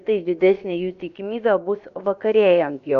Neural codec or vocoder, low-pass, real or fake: codec, 16 kHz, about 1 kbps, DyCAST, with the encoder's durations; 7.2 kHz; fake